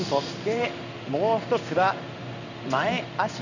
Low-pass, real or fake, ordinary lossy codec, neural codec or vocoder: 7.2 kHz; fake; none; codec, 16 kHz in and 24 kHz out, 1 kbps, XY-Tokenizer